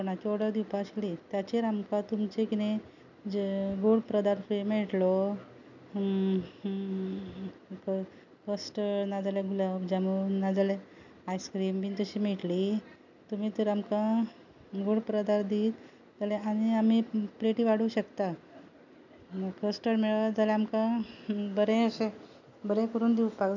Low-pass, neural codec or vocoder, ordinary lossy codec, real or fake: 7.2 kHz; none; none; real